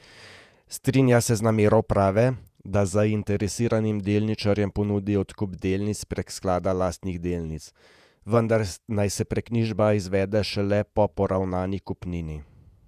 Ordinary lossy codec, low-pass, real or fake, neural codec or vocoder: none; 14.4 kHz; fake; vocoder, 48 kHz, 128 mel bands, Vocos